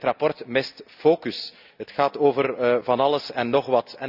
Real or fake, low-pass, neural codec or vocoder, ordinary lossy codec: real; 5.4 kHz; none; none